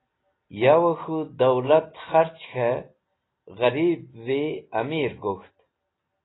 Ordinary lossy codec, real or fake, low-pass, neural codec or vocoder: AAC, 16 kbps; real; 7.2 kHz; none